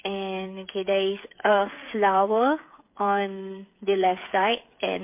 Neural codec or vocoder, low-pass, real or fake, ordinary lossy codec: codec, 16 kHz, 8 kbps, FreqCodec, smaller model; 3.6 kHz; fake; MP3, 24 kbps